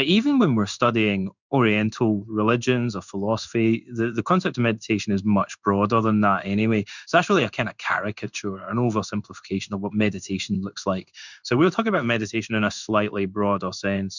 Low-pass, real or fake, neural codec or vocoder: 7.2 kHz; fake; codec, 16 kHz in and 24 kHz out, 1 kbps, XY-Tokenizer